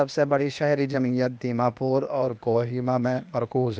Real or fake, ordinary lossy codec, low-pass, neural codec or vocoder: fake; none; none; codec, 16 kHz, 0.8 kbps, ZipCodec